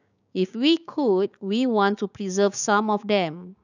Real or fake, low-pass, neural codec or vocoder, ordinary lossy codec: fake; 7.2 kHz; codec, 16 kHz, 6 kbps, DAC; none